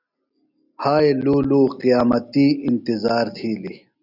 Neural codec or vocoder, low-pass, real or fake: none; 5.4 kHz; real